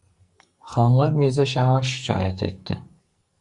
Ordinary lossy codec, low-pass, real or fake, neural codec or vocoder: Opus, 64 kbps; 10.8 kHz; fake; codec, 44.1 kHz, 2.6 kbps, SNAC